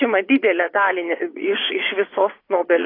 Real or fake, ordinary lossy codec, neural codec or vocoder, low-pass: fake; AAC, 24 kbps; vocoder, 24 kHz, 100 mel bands, Vocos; 5.4 kHz